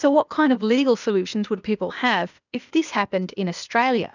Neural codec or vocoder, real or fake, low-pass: codec, 16 kHz, 0.8 kbps, ZipCodec; fake; 7.2 kHz